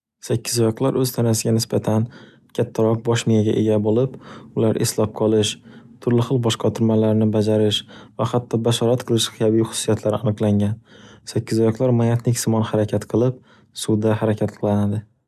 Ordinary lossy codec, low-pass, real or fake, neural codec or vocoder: none; 14.4 kHz; real; none